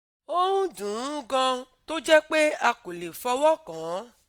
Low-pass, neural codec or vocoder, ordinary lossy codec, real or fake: 19.8 kHz; none; none; real